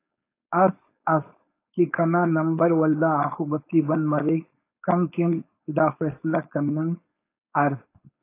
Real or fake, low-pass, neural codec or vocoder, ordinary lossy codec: fake; 3.6 kHz; codec, 16 kHz, 4.8 kbps, FACodec; AAC, 24 kbps